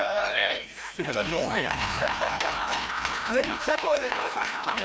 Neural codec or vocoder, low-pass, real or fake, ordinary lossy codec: codec, 16 kHz, 1 kbps, FreqCodec, larger model; none; fake; none